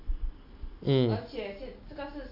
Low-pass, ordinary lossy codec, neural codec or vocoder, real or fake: 5.4 kHz; none; none; real